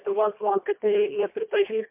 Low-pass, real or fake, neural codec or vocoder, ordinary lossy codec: 3.6 kHz; fake; codec, 24 kHz, 1.5 kbps, HILCodec; MP3, 32 kbps